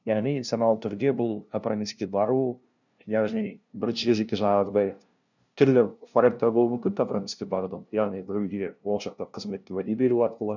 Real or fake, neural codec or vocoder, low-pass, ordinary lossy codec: fake; codec, 16 kHz, 0.5 kbps, FunCodec, trained on LibriTTS, 25 frames a second; 7.2 kHz; none